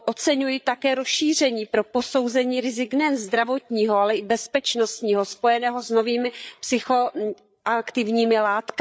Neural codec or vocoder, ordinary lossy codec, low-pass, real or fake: codec, 16 kHz, 8 kbps, FreqCodec, larger model; none; none; fake